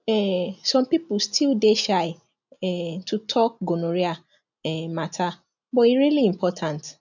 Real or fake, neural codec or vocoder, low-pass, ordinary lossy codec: real; none; 7.2 kHz; none